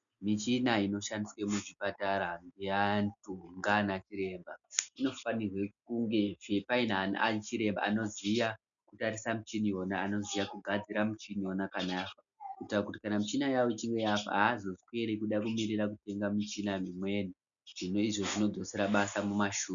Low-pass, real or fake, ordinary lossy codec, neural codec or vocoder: 7.2 kHz; real; MP3, 96 kbps; none